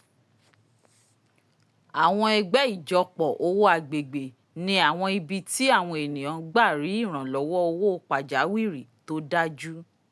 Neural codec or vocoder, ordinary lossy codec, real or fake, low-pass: none; none; real; none